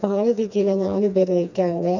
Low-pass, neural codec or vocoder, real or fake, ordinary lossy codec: 7.2 kHz; codec, 16 kHz, 2 kbps, FreqCodec, smaller model; fake; none